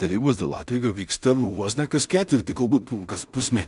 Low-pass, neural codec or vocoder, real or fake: 10.8 kHz; codec, 16 kHz in and 24 kHz out, 0.4 kbps, LongCat-Audio-Codec, two codebook decoder; fake